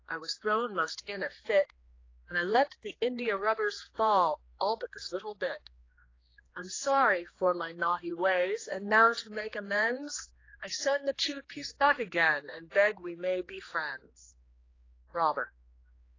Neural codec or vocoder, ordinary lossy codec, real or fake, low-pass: codec, 16 kHz, 2 kbps, X-Codec, HuBERT features, trained on general audio; AAC, 32 kbps; fake; 7.2 kHz